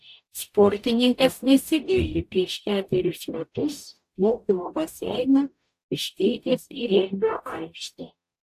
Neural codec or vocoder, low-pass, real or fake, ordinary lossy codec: codec, 44.1 kHz, 0.9 kbps, DAC; 14.4 kHz; fake; AAC, 64 kbps